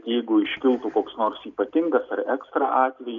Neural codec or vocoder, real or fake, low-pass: none; real; 7.2 kHz